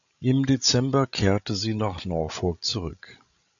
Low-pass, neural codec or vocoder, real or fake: 7.2 kHz; codec, 16 kHz, 16 kbps, FreqCodec, larger model; fake